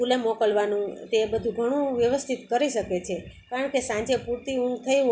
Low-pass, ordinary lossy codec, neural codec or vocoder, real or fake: none; none; none; real